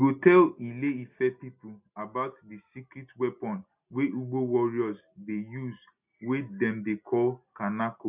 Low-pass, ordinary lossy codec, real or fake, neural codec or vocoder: 3.6 kHz; none; real; none